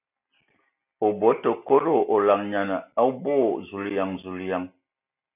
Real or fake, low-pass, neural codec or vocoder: real; 3.6 kHz; none